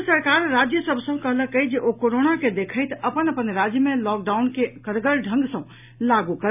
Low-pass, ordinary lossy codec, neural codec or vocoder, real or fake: 3.6 kHz; none; none; real